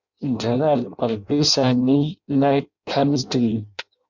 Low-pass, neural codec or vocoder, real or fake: 7.2 kHz; codec, 16 kHz in and 24 kHz out, 0.6 kbps, FireRedTTS-2 codec; fake